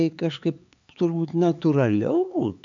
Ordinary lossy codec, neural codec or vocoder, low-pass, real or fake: MP3, 64 kbps; codec, 16 kHz, 4 kbps, X-Codec, HuBERT features, trained on LibriSpeech; 7.2 kHz; fake